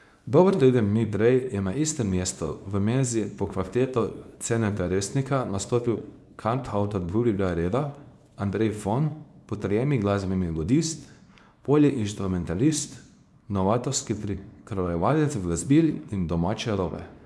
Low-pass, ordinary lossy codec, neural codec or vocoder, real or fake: none; none; codec, 24 kHz, 0.9 kbps, WavTokenizer, small release; fake